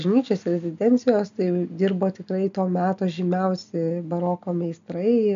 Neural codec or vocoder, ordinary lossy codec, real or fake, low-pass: none; MP3, 64 kbps; real; 7.2 kHz